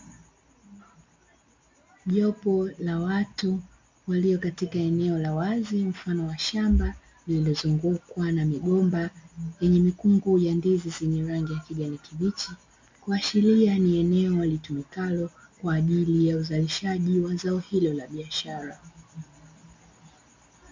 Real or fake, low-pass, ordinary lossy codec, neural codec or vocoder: real; 7.2 kHz; MP3, 64 kbps; none